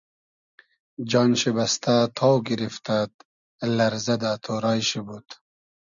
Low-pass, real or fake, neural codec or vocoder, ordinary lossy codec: 7.2 kHz; real; none; MP3, 96 kbps